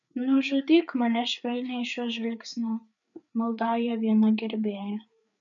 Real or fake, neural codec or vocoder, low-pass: fake; codec, 16 kHz, 4 kbps, FreqCodec, larger model; 7.2 kHz